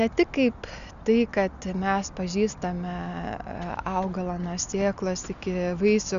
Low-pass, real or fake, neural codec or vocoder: 7.2 kHz; real; none